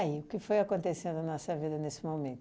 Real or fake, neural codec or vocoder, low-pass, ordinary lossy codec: real; none; none; none